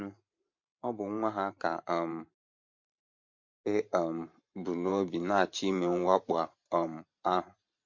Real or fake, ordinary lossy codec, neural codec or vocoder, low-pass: fake; MP3, 48 kbps; vocoder, 44.1 kHz, 128 mel bands every 512 samples, BigVGAN v2; 7.2 kHz